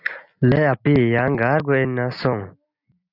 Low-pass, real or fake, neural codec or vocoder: 5.4 kHz; real; none